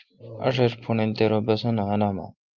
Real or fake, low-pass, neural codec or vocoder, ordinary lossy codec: real; 7.2 kHz; none; Opus, 24 kbps